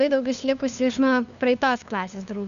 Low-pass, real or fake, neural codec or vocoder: 7.2 kHz; fake; codec, 16 kHz, 2 kbps, FunCodec, trained on LibriTTS, 25 frames a second